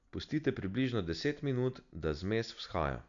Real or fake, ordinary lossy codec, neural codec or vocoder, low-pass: real; none; none; 7.2 kHz